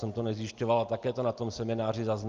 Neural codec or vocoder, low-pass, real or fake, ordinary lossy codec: none; 7.2 kHz; real; Opus, 32 kbps